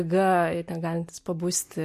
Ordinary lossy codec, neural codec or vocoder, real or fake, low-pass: MP3, 64 kbps; none; real; 14.4 kHz